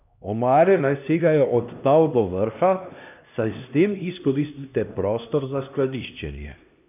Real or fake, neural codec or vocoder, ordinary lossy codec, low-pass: fake; codec, 16 kHz, 1 kbps, X-Codec, HuBERT features, trained on LibriSpeech; none; 3.6 kHz